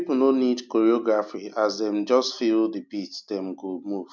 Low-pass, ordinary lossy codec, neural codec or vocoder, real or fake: 7.2 kHz; MP3, 64 kbps; none; real